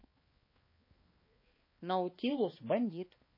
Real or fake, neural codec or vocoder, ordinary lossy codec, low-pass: fake; codec, 16 kHz, 2 kbps, X-Codec, HuBERT features, trained on balanced general audio; MP3, 24 kbps; 5.4 kHz